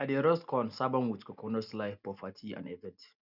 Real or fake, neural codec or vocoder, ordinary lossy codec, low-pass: real; none; none; 5.4 kHz